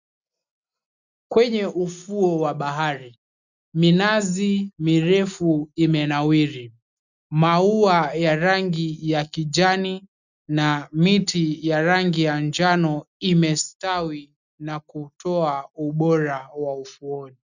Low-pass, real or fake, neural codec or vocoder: 7.2 kHz; real; none